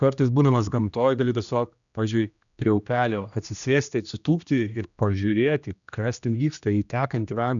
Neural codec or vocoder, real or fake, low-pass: codec, 16 kHz, 1 kbps, X-Codec, HuBERT features, trained on general audio; fake; 7.2 kHz